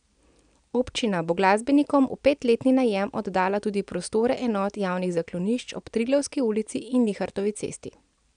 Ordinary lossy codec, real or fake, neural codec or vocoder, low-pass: none; real; none; 9.9 kHz